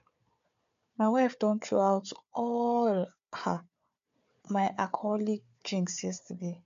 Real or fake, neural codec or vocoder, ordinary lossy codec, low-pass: fake; codec, 16 kHz, 4 kbps, FunCodec, trained on Chinese and English, 50 frames a second; MP3, 48 kbps; 7.2 kHz